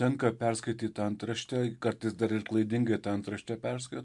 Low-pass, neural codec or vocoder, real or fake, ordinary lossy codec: 10.8 kHz; none; real; MP3, 64 kbps